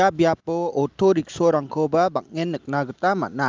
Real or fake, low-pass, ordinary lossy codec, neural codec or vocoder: real; 7.2 kHz; Opus, 24 kbps; none